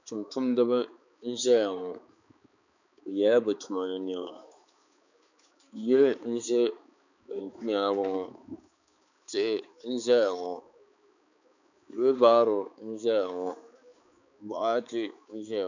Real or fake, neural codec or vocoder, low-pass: fake; codec, 16 kHz, 4 kbps, X-Codec, HuBERT features, trained on balanced general audio; 7.2 kHz